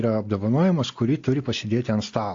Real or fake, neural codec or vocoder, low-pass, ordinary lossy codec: real; none; 7.2 kHz; AAC, 48 kbps